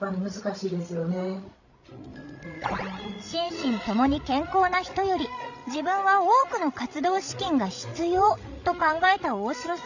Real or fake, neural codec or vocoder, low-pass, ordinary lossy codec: fake; codec, 16 kHz, 16 kbps, FreqCodec, larger model; 7.2 kHz; none